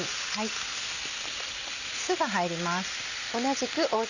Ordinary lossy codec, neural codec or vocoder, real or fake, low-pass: none; none; real; 7.2 kHz